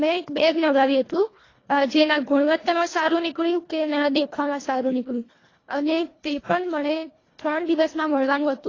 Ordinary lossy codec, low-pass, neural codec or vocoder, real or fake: AAC, 32 kbps; 7.2 kHz; codec, 24 kHz, 1.5 kbps, HILCodec; fake